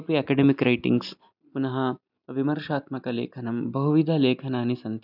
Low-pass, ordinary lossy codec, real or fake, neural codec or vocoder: 5.4 kHz; none; real; none